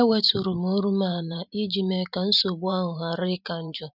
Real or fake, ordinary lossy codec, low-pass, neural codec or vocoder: fake; none; 5.4 kHz; vocoder, 44.1 kHz, 128 mel bands every 512 samples, BigVGAN v2